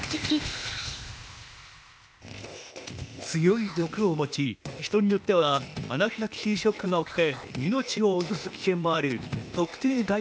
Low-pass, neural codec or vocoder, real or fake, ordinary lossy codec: none; codec, 16 kHz, 0.8 kbps, ZipCodec; fake; none